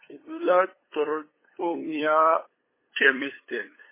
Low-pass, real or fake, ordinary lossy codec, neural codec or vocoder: 3.6 kHz; fake; MP3, 16 kbps; codec, 16 kHz, 8 kbps, FunCodec, trained on LibriTTS, 25 frames a second